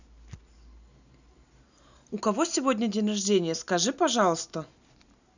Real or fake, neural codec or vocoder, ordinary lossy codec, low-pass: real; none; none; 7.2 kHz